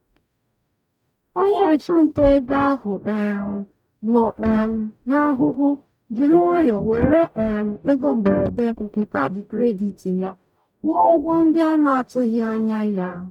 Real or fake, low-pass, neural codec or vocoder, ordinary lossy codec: fake; 19.8 kHz; codec, 44.1 kHz, 0.9 kbps, DAC; none